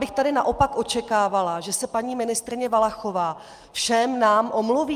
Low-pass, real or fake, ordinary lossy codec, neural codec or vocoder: 14.4 kHz; real; Opus, 32 kbps; none